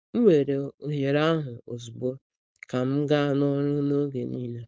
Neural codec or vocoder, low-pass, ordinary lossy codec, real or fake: codec, 16 kHz, 4.8 kbps, FACodec; none; none; fake